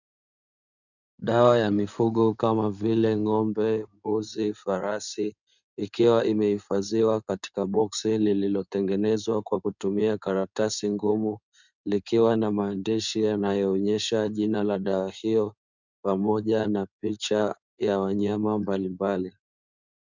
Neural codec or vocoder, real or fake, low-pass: codec, 16 kHz in and 24 kHz out, 2.2 kbps, FireRedTTS-2 codec; fake; 7.2 kHz